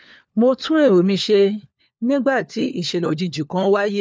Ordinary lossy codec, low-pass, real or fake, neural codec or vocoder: none; none; fake; codec, 16 kHz, 4 kbps, FunCodec, trained on LibriTTS, 50 frames a second